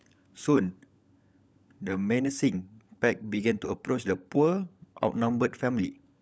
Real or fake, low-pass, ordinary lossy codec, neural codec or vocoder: fake; none; none; codec, 16 kHz, 16 kbps, FunCodec, trained on LibriTTS, 50 frames a second